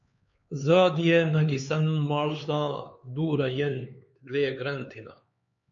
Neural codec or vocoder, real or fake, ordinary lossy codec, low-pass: codec, 16 kHz, 4 kbps, X-Codec, HuBERT features, trained on LibriSpeech; fake; MP3, 48 kbps; 7.2 kHz